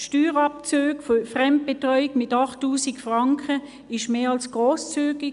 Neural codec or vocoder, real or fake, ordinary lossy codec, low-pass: none; real; none; 10.8 kHz